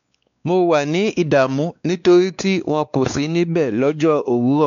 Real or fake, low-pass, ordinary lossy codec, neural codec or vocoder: fake; 7.2 kHz; none; codec, 16 kHz, 2 kbps, X-Codec, WavLM features, trained on Multilingual LibriSpeech